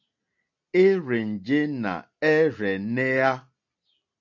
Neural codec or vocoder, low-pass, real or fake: none; 7.2 kHz; real